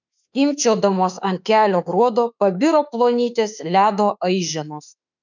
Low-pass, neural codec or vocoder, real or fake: 7.2 kHz; autoencoder, 48 kHz, 32 numbers a frame, DAC-VAE, trained on Japanese speech; fake